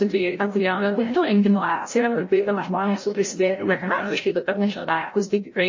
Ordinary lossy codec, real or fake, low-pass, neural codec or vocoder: MP3, 32 kbps; fake; 7.2 kHz; codec, 16 kHz, 0.5 kbps, FreqCodec, larger model